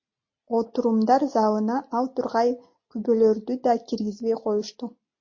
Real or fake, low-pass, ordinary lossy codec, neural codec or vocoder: real; 7.2 kHz; MP3, 32 kbps; none